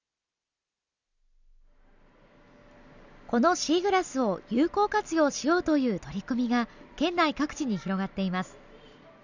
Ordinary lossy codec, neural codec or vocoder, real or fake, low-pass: none; none; real; 7.2 kHz